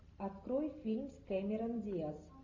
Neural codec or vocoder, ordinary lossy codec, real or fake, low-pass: none; AAC, 48 kbps; real; 7.2 kHz